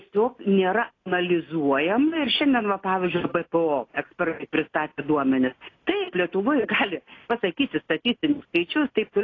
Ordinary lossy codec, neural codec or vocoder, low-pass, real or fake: AAC, 32 kbps; none; 7.2 kHz; real